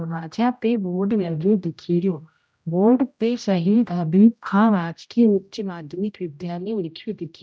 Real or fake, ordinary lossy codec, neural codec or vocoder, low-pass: fake; none; codec, 16 kHz, 0.5 kbps, X-Codec, HuBERT features, trained on general audio; none